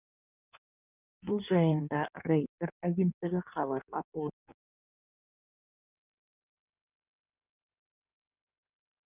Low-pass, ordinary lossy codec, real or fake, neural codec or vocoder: 3.6 kHz; none; fake; codec, 16 kHz in and 24 kHz out, 1.1 kbps, FireRedTTS-2 codec